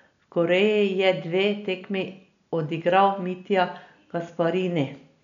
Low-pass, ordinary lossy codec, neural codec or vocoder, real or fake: 7.2 kHz; none; none; real